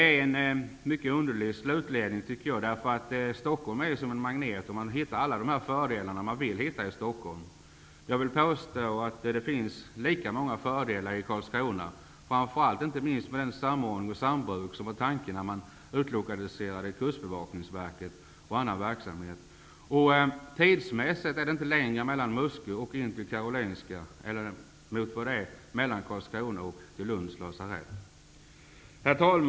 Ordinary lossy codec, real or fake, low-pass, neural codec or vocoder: none; real; none; none